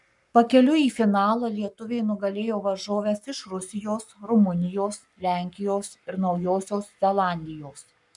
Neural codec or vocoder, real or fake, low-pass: codec, 44.1 kHz, 7.8 kbps, Pupu-Codec; fake; 10.8 kHz